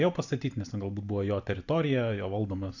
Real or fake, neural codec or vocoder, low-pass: fake; vocoder, 44.1 kHz, 128 mel bands every 512 samples, BigVGAN v2; 7.2 kHz